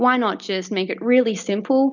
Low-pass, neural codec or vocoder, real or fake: 7.2 kHz; none; real